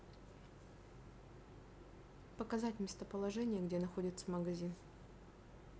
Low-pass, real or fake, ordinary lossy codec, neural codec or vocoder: none; real; none; none